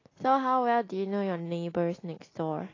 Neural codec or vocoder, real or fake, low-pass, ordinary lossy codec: none; real; 7.2 kHz; AAC, 32 kbps